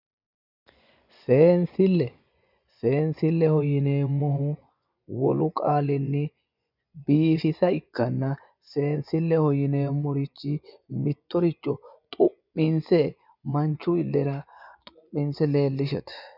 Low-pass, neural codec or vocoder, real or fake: 5.4 kHz; vocoder, 24 kHz, 100 mel bands, Vocos; fake